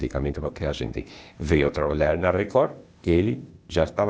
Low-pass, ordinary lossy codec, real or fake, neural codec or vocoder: none; none; fake; codec, 16 kHz, 0.8 kbps, ZipCodec